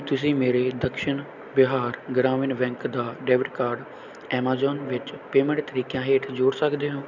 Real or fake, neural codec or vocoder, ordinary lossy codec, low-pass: real; none; none; 7.2 kHz